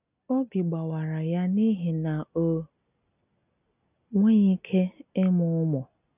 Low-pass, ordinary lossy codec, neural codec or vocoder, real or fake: 3.6 kHz; none; none; real